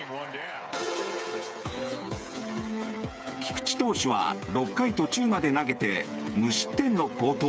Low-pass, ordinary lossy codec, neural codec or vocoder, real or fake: none; none; codec, 16 kHz, 8 kbps, FreqCodec, smaller model; fake